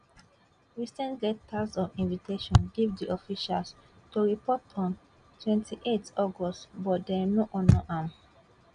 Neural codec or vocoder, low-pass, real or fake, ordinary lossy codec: none; 9.9 kHz; real; none